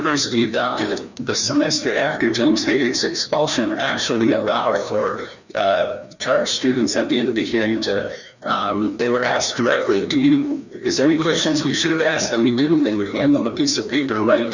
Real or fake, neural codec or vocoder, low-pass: fake; codec, 16 kHz, 1 kbps, FreqCodec, larger model; 7.2 kHz